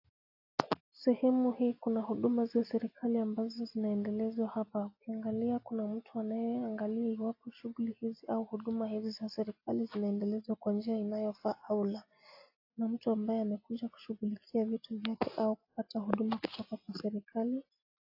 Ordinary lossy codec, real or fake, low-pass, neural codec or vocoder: AAC, 32 kbps; real; 5.4 kHz; none